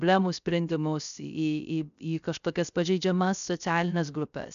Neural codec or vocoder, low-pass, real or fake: codec, 16 kHz, 0.3 kbps, FocalCodec; 7.2 kHz; fake